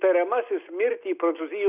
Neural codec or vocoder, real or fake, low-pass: none; real; 3.6 kHz